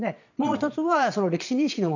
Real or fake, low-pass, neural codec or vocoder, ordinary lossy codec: fake; 7.2 kHz; vocoder, 22.05 kHz, 80 mel bands, Vocos; none